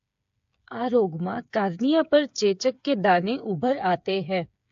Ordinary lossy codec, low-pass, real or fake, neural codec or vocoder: AAC, 64 kbps; 7.2 kHz; fake; codec, 16 kHz, 8 kbps, FreqCodec, smaller model